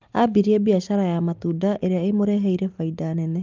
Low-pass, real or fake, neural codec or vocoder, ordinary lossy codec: 7.2 kHz; real; none; Opus, 24 kbps